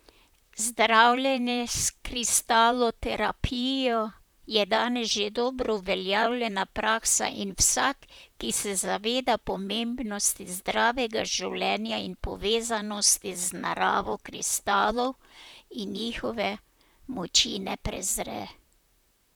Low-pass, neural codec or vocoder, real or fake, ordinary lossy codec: none; vocoder, 44.1 kHz, 128 mel bands, Pupu-Vocoder; fake; none